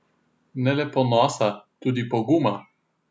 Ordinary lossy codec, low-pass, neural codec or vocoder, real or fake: none; none; none; real